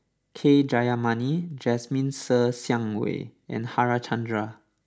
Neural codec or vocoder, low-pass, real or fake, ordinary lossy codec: none; none; real; none